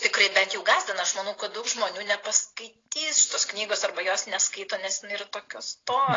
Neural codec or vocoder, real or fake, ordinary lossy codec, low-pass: none; real; AAC, 32 kbps; 7.2 kHz